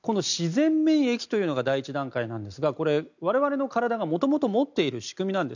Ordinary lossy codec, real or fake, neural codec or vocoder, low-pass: none; real; none; 7.2 kHz